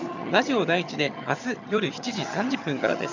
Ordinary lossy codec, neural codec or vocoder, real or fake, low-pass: none; vocoder, 22.05 kHz, 80 mel bands, HiFi-GAN; fake; 7.2 kHz